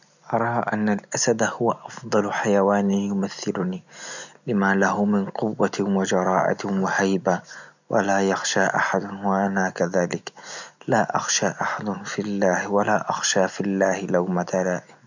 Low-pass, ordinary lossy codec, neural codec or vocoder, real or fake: 7.2 kHz; none; none; real